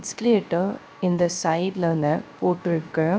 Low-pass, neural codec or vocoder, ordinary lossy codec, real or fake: none; codec, 16 kHz, 0.3 kbps, FocalCodec; none; fake